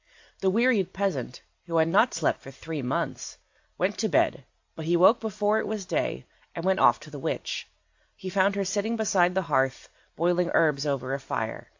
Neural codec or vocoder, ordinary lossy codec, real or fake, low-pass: none; AAC, 48 kbps; real; 7.2 kHz